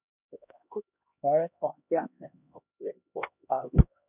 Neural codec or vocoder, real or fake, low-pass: codec, 16 kHz, 1 kbps, X-Codec, HuBERT features, trained on LibriSpeech; fake; 3.6 kHz